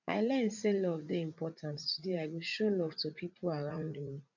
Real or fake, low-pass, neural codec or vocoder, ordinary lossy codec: fake; 7.2 kHz; vocoder, 44.1 kHz, 80 mel bands, Vocos; none